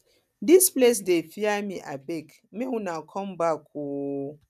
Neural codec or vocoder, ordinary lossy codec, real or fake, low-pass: none; none; real; 14.4 kHz